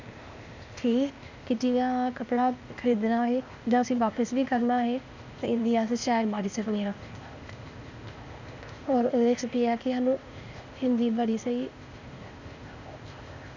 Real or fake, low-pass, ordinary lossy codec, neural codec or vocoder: fake; 7.2 kHz; Opus, 64 kbps; codec, 16 kHz, 0.8 kbps, ZipCodec